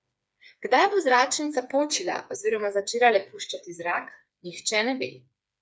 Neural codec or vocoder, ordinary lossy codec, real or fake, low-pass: codec, 16 kHz, 4 kbps, FreqCodec, smaller model; none; fake; none